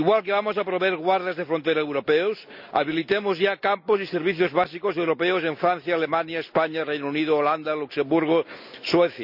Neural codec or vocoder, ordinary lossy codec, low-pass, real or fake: none; none; 5.4 kHz; real